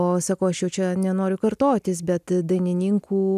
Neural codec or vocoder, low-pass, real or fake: none; 14.4 kHz; real